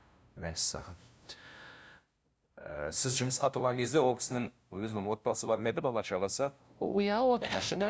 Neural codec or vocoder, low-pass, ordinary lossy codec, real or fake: codec, 16 kHz, 0.5 kbps, FunCodec, trained on LibriTTS, 25 frames a second; none; none; fake